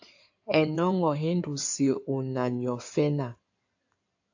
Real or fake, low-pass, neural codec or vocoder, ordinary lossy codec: fake; 7.2 kHz; codec, 16 kHz in and 24 kHz out, 2.2 kbps, FireRedTTS-2 codec; AAC, 48 kbps